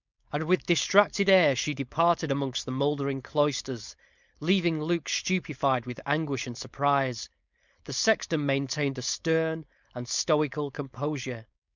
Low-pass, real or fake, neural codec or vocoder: 7.2 kHz; fake; codec, 16 kHz, 4.8 kbps, FACodec